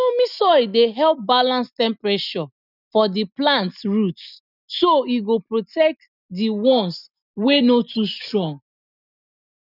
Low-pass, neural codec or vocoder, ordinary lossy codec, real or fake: 5.4 kHz; none; none; real